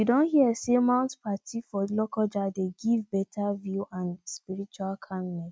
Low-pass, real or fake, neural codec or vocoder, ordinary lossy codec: none; real; none; none